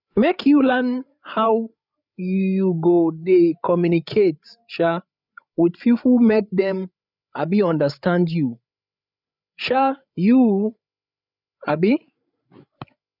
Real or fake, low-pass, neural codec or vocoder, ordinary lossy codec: fake; 5.4 kHz; codec, 16 kHz, 8 kbps, FreqCodec, larger model; none